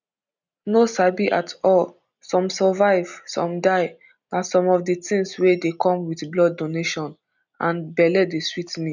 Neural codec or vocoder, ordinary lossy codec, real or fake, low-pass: none; none; real; 7.2 kHz